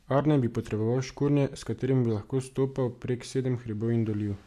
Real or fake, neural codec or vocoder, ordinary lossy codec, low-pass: real; none; AAC, 96 kbps; 14.4 kHz